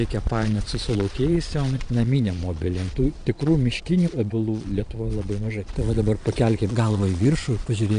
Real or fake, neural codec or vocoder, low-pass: fake; vocoder, 22.05 kHz, 80 mel bands, Vocos; 9.9 kHz